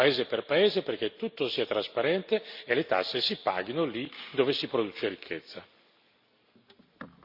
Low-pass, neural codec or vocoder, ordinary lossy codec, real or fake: 5.4 kHz; none; Opus, 64 kbps; real